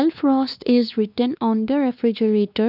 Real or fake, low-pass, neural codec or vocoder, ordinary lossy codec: fake; 5.4 kHz; codec, 16 kHz, 2 kbps, X-Codec, WavLM features, trained on Multilingual LibriSpeech; none